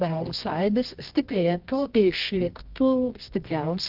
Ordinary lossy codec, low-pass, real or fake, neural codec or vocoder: Opus, 24 kbps; 5.4 kHz; fake; codec, 24 kHz, 0.9 kbps, WavTokenizer, medium music audio release